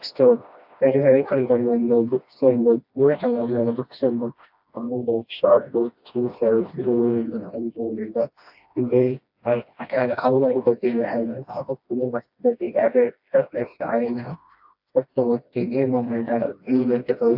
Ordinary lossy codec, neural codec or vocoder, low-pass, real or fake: AAC, 48 kbps; codec, 16 kHz, 1 kbps, FreqCodec, smaller model; 5.4 kHz; fake